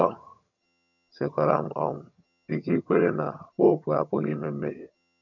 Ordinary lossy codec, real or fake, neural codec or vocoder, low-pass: none; fake; vocoder, 22.05 kHz, 80 mel bands, HiFi-GAN; 7.2 kHz